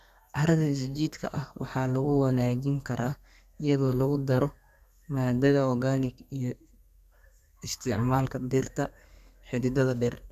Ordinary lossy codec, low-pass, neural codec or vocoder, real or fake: none; 14.4 kHz; codec, 32 kHz, 1.9 kbps, SNAC; fake